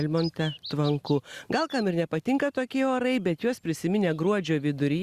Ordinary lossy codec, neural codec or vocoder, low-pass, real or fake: Opus, 64 kbps; none; 14.4 kHz; real